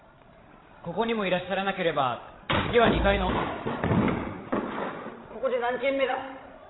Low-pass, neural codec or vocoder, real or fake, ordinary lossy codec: 7.2 kHz; codec, 16 kHz, 16 kbps, FreqCodec, larger model; fake; AAC, 16 kbps